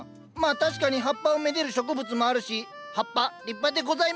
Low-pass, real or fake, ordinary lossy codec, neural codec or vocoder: none; real; none; none